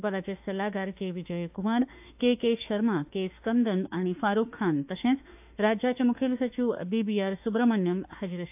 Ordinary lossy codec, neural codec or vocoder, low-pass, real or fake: AAC, 32 kbps; autoencoder, 48 kHz, 32 numbers a frame, DAC-VAE, trained on Japanese speech; 3.6 kHz; fake